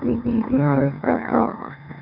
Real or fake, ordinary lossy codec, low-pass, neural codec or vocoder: fake; none; 5.4 kHz; autoencoder, 44.1 kHz, a latent of 192 numbers a frame, MeloTTS